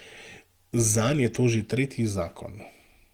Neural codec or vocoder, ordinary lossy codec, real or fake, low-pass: none; Opus, 24 kbps; real; 19.8 kHz